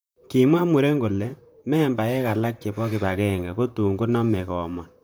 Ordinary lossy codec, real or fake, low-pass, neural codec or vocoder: none; fake; none; vocoder, 44.1 kHz, 128 mel bands, Pupu-Vocoder